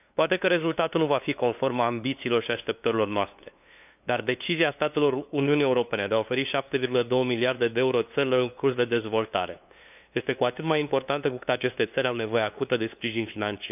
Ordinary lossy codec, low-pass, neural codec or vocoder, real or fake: none; 3.6 kHz; codec, 16 kHz, 2 kbps, FunCodec, trained on LibriTTS, 25 frames a second; fake